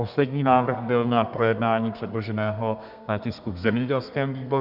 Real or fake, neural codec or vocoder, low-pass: fake; codec, 32 kHz, 1.9 kbps, SNAC; 5.4 kHz